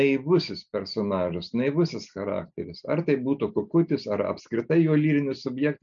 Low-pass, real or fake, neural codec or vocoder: 7.2 kHz; real; none